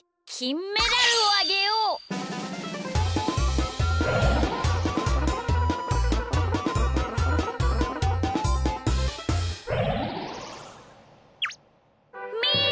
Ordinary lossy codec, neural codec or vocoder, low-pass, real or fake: none; none; none; real